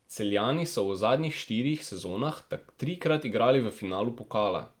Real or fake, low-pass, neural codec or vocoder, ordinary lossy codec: real; 19.8 kHz; none; Opus, 24 kbps